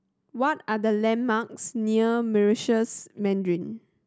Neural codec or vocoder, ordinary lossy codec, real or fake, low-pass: none; none; real; none